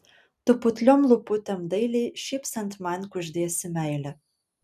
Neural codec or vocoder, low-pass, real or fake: none; 14.4 kHz; real